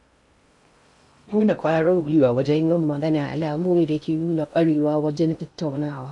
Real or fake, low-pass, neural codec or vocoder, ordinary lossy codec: fake; 10.8 kHz; codec, 16 kHz in and 24 kHz out, 0.6 kbps, FocalCodec, streaming, 2048 codes; none